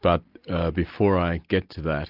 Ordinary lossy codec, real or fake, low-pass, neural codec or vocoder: Opus, 32 kbps; real; 5.4 kHz; none